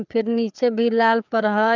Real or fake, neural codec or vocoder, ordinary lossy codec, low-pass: fake; codec, 16 kHz, 4 kbps, FreqCodec, larger model; none; 7.2 kHz